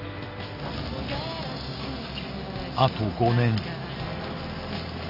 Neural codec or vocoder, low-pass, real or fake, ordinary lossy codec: none; 5.4 kHz; real; none